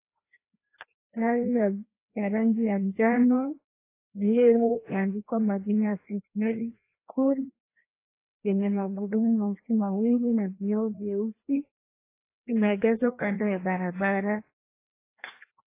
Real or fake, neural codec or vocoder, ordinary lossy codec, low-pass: fake; codec, 16 kHz, 1 kbps, FreqCodec, larger model; AAC, 24 kbps; 3.6 kHz